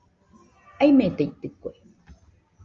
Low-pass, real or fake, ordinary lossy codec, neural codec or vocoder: 7.2 kHz; real; Opus, 32 kbps; none